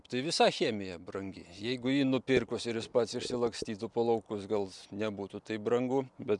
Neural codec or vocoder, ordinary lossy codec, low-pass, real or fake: none; MP3, 96 kbps; 10.8 kHz; real